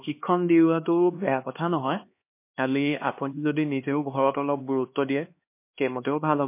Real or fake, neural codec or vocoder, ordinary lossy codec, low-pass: fake; codec, 16 kHz, 2 kbps, X-Codec, HuBERT features, trained on LibriSpeech; MP3, 24 kbps; 3.6 kHz